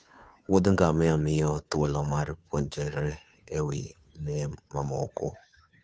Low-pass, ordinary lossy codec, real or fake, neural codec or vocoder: none; none; fake; codec, 16 kHz, 2 kbps, FunCodec, trained on Chinese and English, 25 frames a second